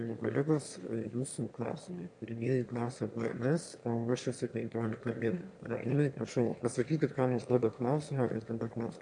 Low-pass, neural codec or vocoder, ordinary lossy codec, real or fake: 9.9 kHz; autoencoder, 22.05 kHz, a latent of 192 numbers a frame, VITS, trained on one speaker; AAC, 64 kbps; fake